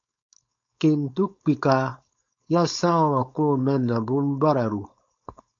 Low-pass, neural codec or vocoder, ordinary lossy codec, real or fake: 7.2 kHz; codec, 16 kHz, 4.8 kbps, FACodec; MP3, 64 kbps; fake